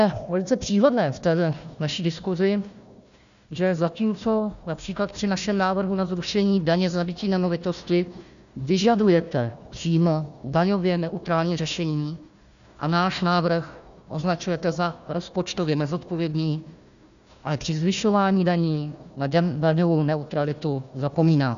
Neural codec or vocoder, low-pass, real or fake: codec, 16 kHz, 1 kbps, FunCodec, trained on Chinese and English, 50 frames a second; 7.2 kHz; fake